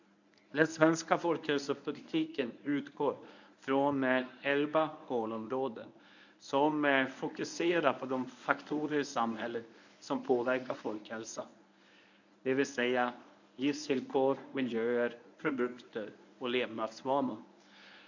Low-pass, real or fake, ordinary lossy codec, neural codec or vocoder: 7.2 kHz; fake; none; codec, 24 kHz, 0.9 kbps, WavTokenizer, medium speech release version 1